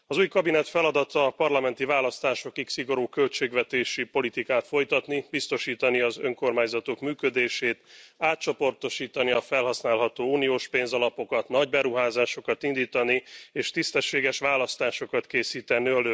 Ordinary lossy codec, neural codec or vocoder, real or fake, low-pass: none; none; real; none